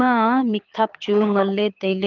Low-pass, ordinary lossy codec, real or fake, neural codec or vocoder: 7.2 kHz; Opus, 24 kbps; fake; codec, 24 kHz, 6 kbps, HILCodec